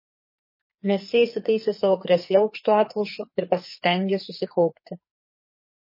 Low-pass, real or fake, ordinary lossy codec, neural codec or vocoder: 5.4 kHz; fake; MP3, 24 kbps; codec, 44.1 kHz, 2.6 kbps, SNAC